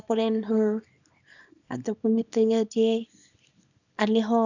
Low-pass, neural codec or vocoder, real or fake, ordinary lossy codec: 7.2 kHz; codec, 24 kHz, 0.9 kbps, WavTokenizer, small release; fake; none